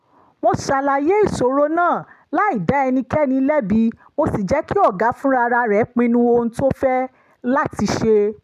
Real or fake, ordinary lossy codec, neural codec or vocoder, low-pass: real; MP3, 96 kbps; none; 14.4 kHz